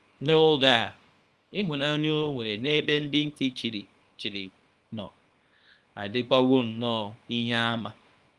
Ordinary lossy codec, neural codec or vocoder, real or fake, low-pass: Opus, 32 kbps; codec, 24 kHz, 0.9 kbps, WavTokenizer, small release; fake; 10.8 kHz